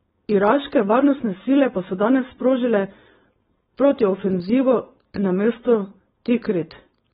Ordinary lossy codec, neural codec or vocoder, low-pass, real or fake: AAC, 16 kbps; codec, 16 kHz, 4.8 kbps, FACodec; 7.2 kHz; fake